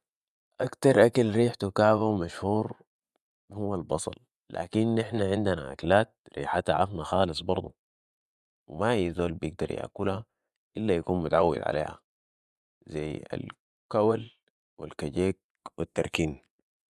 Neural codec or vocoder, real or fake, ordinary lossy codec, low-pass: vocoder, 24 kHz, 100 mel bands, Vocos; fake; none; none